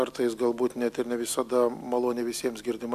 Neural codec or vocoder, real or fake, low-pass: none; real; 14.4 kHz